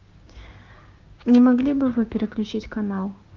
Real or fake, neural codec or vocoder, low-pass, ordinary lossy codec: fake; codec, 16 kHz, 6 kbps, DAC; 7.2 kHz; Opus, 24 kbps